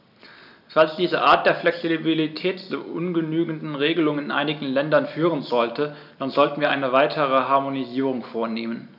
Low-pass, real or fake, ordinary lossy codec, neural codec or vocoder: 5.4 kHz; real; none; none